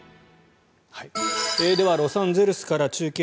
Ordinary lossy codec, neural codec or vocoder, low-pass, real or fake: none; none; none; real